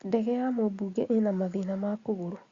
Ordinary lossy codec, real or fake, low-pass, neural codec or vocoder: Opus, 64 kbps; real; 7.2 kHz; none